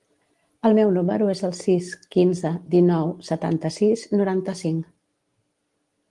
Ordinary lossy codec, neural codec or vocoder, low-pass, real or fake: Opus, 24 kbps; none; 10.8 kHz; real